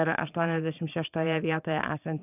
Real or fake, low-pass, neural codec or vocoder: fake; 3.6 kHz; vocoder, 22.05 kHz, 80 mel bands, Vocos